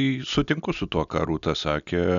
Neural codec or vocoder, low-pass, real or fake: none; 7.2 kHz; real